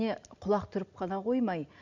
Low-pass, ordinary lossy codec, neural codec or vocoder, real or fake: 7.2 kHz; none; none; real